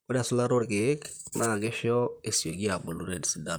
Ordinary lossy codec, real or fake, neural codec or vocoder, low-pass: none; fake; vocoder, 44.1 kHz, 128 mel bands, Pupu-Vocoder; none